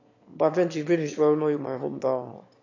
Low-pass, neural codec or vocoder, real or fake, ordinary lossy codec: 7.2 kHz; autoencoder, 22.05 kHz, a latent of 192 numbers a frame, VITS, trained on one speaker; fake; AAC, 48 kbps